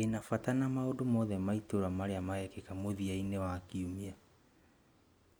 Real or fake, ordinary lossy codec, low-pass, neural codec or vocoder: real; none; none; none